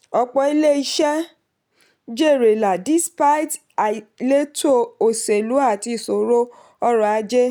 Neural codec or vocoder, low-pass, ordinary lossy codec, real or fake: none; none; none; real